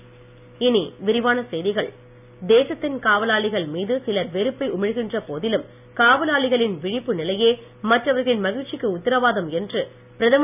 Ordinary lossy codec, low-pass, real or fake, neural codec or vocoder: none; 3.6 kHz; real; none